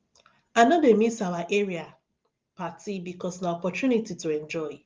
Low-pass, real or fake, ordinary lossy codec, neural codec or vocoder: 7.2 kHz; real; Opus, 32 kbps; none